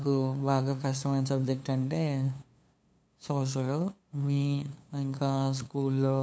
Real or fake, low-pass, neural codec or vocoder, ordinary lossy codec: fake; none; codec, 16 kHz, 2 kbps, FunCodec, trained on LibriTTS, 25 frames a second; none